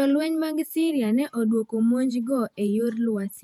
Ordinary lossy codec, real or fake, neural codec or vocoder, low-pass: none; fake; vocoder, 48 kHz, 128 mel bands, Vocos; 19.8 kHz